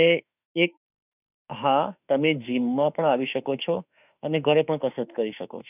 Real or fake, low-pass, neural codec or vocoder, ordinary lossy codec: fake; 3.6 kHz; autoencoder, 48 kHz, 32 numbers a frame, DAC-VAE, trained on Japanese speech; none